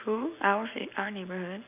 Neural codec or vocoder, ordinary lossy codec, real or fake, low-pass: none; none; real; 3.6 kHz